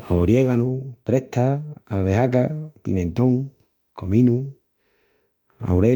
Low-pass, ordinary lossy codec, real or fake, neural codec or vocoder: 19.8 kHz; none; fake; autoencoder, 48 kHz, 32 numbers a frame, DAC-VAE, trained on Japanese speech